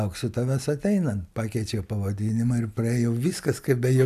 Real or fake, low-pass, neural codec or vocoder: real; 14.4 kHz; none